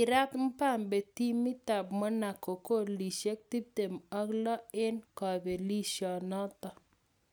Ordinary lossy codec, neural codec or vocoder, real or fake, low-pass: none; none; real; none